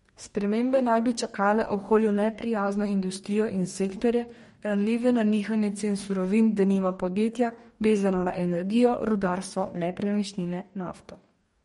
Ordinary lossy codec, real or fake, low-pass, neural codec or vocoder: MP3, 48 kbps; fake; 19.8 kHz; codec, 44.1 kHz, 2.6 kbps, DAC